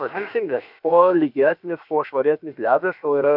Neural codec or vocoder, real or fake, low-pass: codec, 16 kHz, about 1 kbps, DyCAST, with the encoder's durations; fake; 5.4 kHz